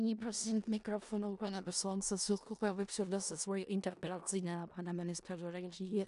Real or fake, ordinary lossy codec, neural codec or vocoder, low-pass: fake; AAC, 96 kbps; codec, 16 kHz in and 24 kHz out, 0.4 kbps, LongCat-Audio-Codec, four codebook decoder; 10.8 kHz